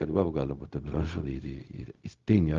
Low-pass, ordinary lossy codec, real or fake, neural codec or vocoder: 7.2 kHz; Opus, 32 kbps; fake; codec, 16 kHz, 0.4 kbps, LongCat-Audio-Codec